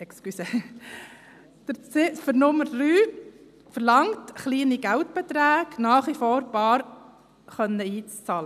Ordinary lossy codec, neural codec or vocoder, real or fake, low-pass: none; none; real; 14.4 kHz